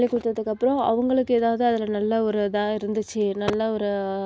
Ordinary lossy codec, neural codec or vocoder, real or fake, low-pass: none; none; real; none